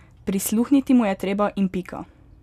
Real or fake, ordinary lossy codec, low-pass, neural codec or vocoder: real; none; 14.4 kHz; none